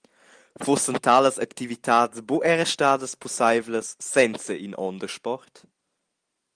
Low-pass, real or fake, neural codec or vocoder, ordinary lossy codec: 9.9 kHz; real; none; Opus, 32 kbps